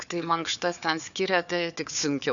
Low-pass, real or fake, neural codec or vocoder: 7.2 kHz; fake; codec, 16 kHz, 4 kbps, FunCodec, trained on Chinese and English, 50 frames a second